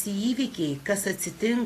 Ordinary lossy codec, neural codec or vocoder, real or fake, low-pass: AAC, 48 kbps; none; real; 14.4 kHz